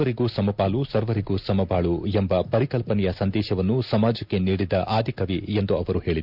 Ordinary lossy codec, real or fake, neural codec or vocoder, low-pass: none; real; none; 5.4 kHz